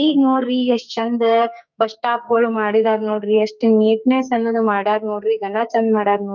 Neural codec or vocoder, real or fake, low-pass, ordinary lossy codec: codec, 44.1 kHz, 2.6 kbps, SNAC; fake; 7.2 kHz; none